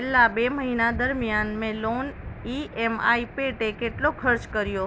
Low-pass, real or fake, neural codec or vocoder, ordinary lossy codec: none; real; none; none